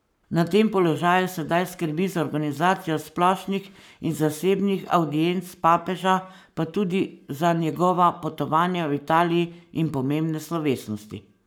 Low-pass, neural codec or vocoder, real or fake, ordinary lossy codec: none; codec, 44.1 kHz, 7.8 kbps, Pupu-Codec; fake; none